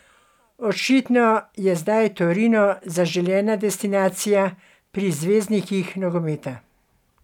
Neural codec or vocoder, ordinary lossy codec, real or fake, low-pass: none; none; real; 19.8 kHz